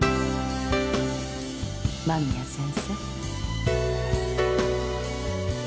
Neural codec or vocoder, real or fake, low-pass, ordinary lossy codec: none; real; none; none